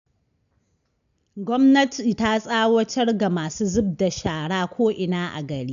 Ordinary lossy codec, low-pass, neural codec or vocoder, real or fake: none; 7.2 kHz; none; real